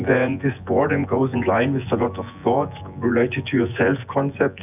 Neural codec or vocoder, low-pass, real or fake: vocoder, 24 kHz, 100 mel bands, Vocos; 3.6 kHz; fake